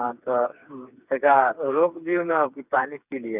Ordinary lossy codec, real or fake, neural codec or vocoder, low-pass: none; fake; codec, 16 kHz, 4 kbps, FreqCodec, smaller model; 3.6 kHz